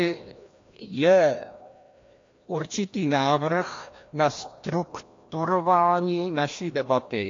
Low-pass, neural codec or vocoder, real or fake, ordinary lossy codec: 7.2 kHz; codec, 16 kHz, 1 kbps, FreqCodec, larger model; fake; AAC, 48 kbps